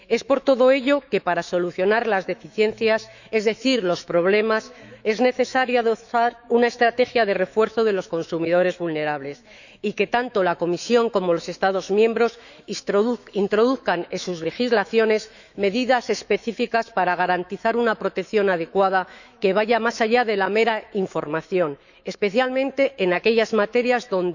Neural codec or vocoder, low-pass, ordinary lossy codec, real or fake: autoencoder, 48 kHz, 128 numbers a frame, DAC-VAE, trained on Japanese speech; 7.2 kHz; none; fake